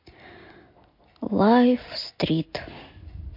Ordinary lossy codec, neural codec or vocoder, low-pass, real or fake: MP3, 32 kbps; none; 5.4 kHz; real